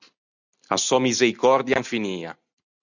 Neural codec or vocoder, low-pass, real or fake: none; 7.2 kHz; real